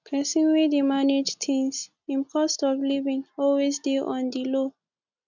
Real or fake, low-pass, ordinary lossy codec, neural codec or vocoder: real; 7.2 kHz; none; none